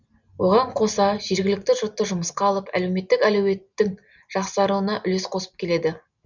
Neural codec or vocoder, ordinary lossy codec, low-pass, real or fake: none; none; 7.2 kHz; real